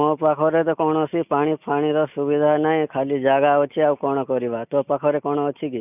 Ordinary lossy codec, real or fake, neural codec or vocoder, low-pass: none; real; none; 3.6 kHz